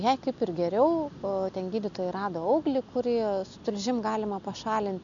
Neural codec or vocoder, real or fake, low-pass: none; real; 7.2 kHz